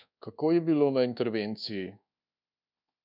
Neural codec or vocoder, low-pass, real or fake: codec, 24 kHz, 1.2 kbps, DualCodec; 5.4 kHz; fake